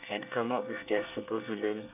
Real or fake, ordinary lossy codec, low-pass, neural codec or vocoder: fake; none; 3.6 kHz; codec, 24 kHz, 1 kbps, SNAC